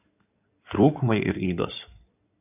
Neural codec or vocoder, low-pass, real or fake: codec, 16 kHz in and 24 kHz out, 2.2 kbps, FireRedTTS-2 codec; 3.6 kHz; fake